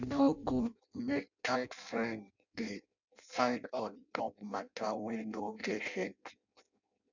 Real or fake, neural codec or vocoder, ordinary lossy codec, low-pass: fake; codec, 16 kHz in and 24 kHz out, 0.6 kbps, FireRedTTS-2 codec; none; 7.2 kHz